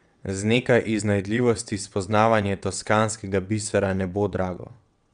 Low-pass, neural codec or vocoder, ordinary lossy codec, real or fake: 9.9 kHz; vocoder, 22.05 kHz, 80 mel bands, WaveNeXt; none; fake